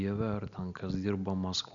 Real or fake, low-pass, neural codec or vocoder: real; 7.2 kHz; none